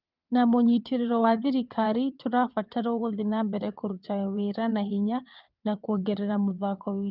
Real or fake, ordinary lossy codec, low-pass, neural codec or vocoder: fake; Opus, 32 kbps; 5.4 kHz; vocoder, 22.05 kHz, 80 mel bands, WaveNeXt